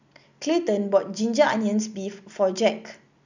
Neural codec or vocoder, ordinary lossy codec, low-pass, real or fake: none; none; 7.2 kHz; real